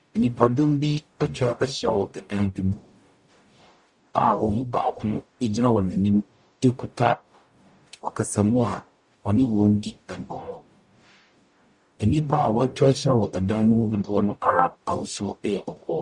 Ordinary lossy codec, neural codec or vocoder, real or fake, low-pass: Opus, 64 kbps; codec, 44.1 kHz, 0.9 kbps, DAC; fake; 10.8 kHz